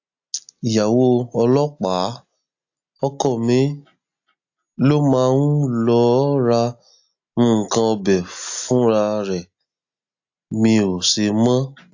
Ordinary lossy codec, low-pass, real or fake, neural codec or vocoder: AAC, 48 kbps; 7.2 kHz; real; none